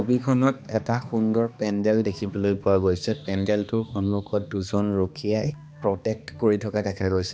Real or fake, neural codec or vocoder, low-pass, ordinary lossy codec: fake; codec, 16 kHz, 2 kbps, X-Codec, HuBERT features, trained on balanced general audio; none; none